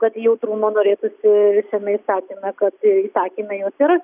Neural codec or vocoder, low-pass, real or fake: none; 3.6 kHz; real